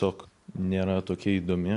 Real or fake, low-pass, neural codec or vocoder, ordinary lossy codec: real; 10.8 kHz; none; AAC, 64 kbps